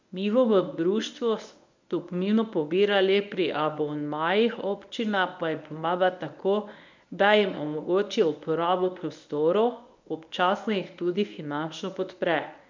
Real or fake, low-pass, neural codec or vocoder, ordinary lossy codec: fake; 7.2 kHz; codec, 24 kHz, 0.9 kbps, WavTokenizer, medium speech release version 1; MP3, 64 kbps